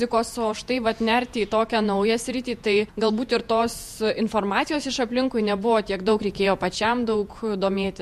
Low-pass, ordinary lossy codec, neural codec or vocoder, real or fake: 14.4 kHz; MP3, 64 kbps; vocoder, 48 kHz, 128 mel bands, Vocos; fake